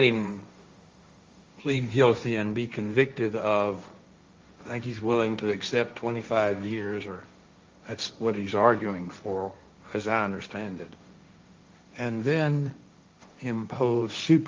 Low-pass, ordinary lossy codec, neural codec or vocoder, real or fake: 7.2 kHz; Opus, 32 kbps; codec, 16 kHz, 1.1 kbps, Voila-Tokenizer; fake